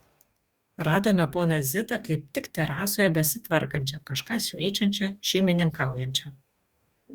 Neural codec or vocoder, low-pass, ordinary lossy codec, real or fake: codec, 44.1 kHz, 2.6 kbps, DAC; 19.8 kHz; Opus, 64 kbps; fake